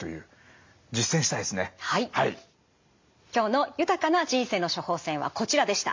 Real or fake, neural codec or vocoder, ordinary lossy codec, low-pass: real; none; MP3, 48 kbps; 7.2 kHz